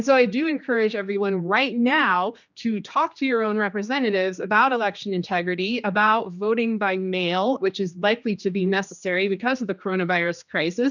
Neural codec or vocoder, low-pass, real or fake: codec, 16 kHz, 2 kbps, X-Codec, HuBERT features, trained on general audio; 7.2 kHz; fake